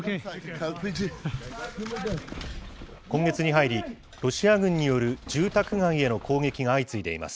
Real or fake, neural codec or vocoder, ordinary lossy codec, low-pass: real; none; none; none